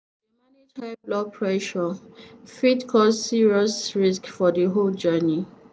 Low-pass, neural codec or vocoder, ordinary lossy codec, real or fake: none; none; none; real